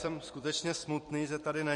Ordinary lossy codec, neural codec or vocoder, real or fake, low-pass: MP3, 48 kbps; vocoder, 44.1 kHz, 128 mel bands every 256 samples, BigVGAN v2; fake; 14.4 kHz